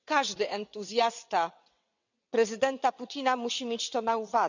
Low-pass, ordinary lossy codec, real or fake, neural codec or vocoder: 7.2 kHz; none; fake; vocoder, 22.05 kHz, 80 mel bands, WaveNeXt